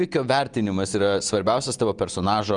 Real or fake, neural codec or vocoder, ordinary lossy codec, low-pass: real; none; Opus, 32 kbps; 9.9 kHz